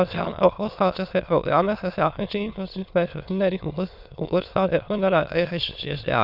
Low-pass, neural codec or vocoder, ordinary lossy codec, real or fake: 5.4 kHz; autoencoder, 22.05 kHz, a latent of 192 numbers a frame, VITS, trained on many speakers; Opus, 64 kbps; fake